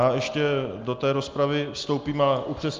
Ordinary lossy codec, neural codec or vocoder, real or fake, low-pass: Opus, 24 kbps; none; real; 7.2 kHz